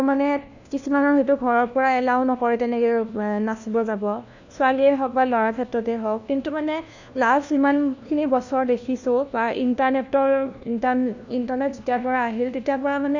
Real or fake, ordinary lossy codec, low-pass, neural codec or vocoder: fake; none; 7.2 kHz; codec, 16 kHz, 1 kbps, FunCodec, trained on LibriTTS, 50 frames a second